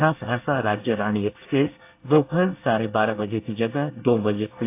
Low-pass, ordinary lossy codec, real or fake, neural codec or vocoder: 3.6 kHz; AAC, 24 kbps; fake; codec, 24 kHz, 1 kbps, SNAC